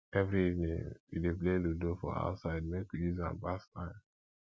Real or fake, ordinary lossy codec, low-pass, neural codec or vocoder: real; none; none; none